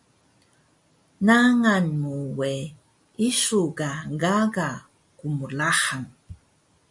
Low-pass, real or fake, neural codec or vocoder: 10.8 kHz; real; none